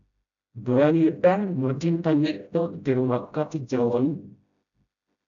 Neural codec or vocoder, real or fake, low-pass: codec, 16 kHz, 0.5 kbps, FreqCodec, smaller model; fake; 7.2 kHz